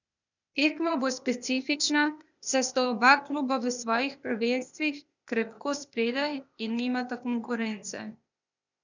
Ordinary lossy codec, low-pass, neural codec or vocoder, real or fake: none; 7.2 kHz; codec, 16 kHz, 0.8 kbps, ZipCodec; fake